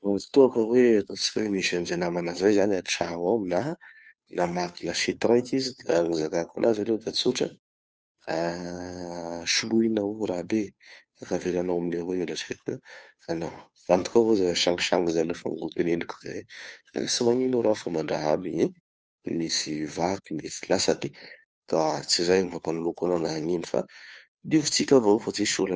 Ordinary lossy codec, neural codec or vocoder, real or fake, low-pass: none; codec, 16 kHz, 2 kbps, FunCodec, trained on Chinese and English, 25 frames a second; fake; none